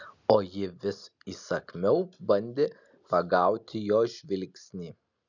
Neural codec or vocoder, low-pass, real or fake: none; 7.2 kHz; real